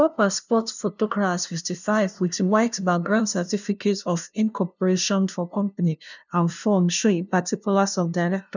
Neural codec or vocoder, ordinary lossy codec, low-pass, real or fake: codec, 16 kHz, 0.5 kbps, FunCodec, trained on LibriTTS, 25 frames a second; none; 7.2 kHz; fake